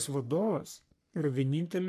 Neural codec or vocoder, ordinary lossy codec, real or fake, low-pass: codec, 44.1 kHz, 3.4 kbps, Pupu-Codec; MP3, 96 kbps; fake; 14.4 kHz